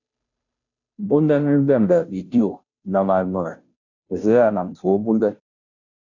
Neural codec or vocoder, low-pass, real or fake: codec, 16 kHz, 0.5 kbps, FunCodec, trained on Chinese and English, 25 frames a second; 7.2 kHz; fake